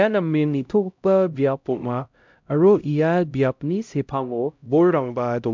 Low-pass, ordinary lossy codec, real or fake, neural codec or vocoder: 7.2 kHz; MP3, 64 kbps; fake; codec, 16 kHz, 0.5 kbps, X-Codec, HuBERT features, trained on LibriSpeech